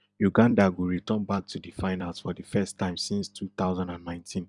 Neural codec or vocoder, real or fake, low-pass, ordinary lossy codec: vocoder, 44.1 kHz, 128 mel bands every 256 samples, BigVGAN v2; fake; 10.8 kHz; none